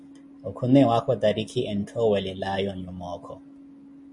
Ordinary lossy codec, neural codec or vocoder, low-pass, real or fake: MP3, 48 kbps; none; 10.8 kHz; real